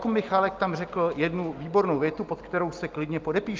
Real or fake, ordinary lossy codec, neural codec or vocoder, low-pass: real; Opus, 24 kbps; none; 7.2 kHz